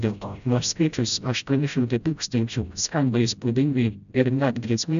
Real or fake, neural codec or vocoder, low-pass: fake; codec, 16 kHz, 0.5 kbps, FreqCodec, smaller model; 7.2 kHz